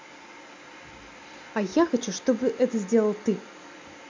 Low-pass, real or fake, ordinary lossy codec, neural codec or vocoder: 7.2 kHz; real; MP3, 64 kbps; none